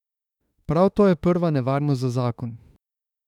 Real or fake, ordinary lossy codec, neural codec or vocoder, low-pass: fake; none; autoencoder, 48 kHz, 32 numbers a frame, DAC-VAE, trained on Japanese speech; 19.8 kHz